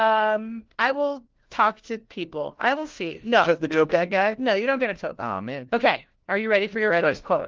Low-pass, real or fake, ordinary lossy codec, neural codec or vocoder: 7.2 kHz; fake; Opus, 16 kbps; codec, 16 kHz, 1 kbps, FunCodec, trained on LibriTTS, 50 frames a second